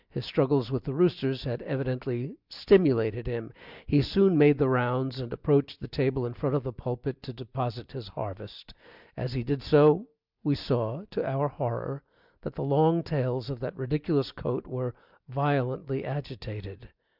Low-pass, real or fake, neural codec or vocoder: 5.4 kHz; real; none